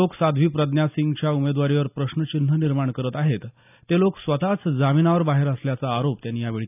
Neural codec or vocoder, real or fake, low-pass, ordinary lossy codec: none; real; 3.6 kHz; none